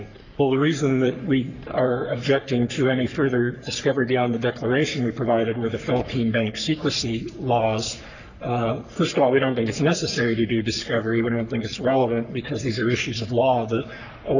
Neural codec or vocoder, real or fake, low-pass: codec, 44.1 kHz, 3.4 kbps, Pupu-Codec; fake; 7.2 kHz